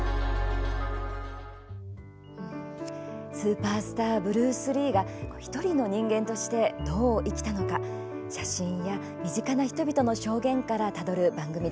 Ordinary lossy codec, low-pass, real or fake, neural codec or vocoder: none; none; real; none